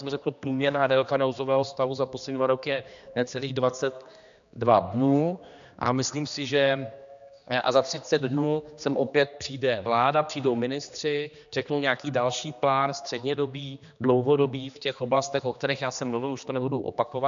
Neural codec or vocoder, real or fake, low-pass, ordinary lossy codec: codec, 16 kHz, 2 kbps, X-Codec, HuBERT features, trained on general audio; fake; 7.2 kHz; AAC, 96 kbps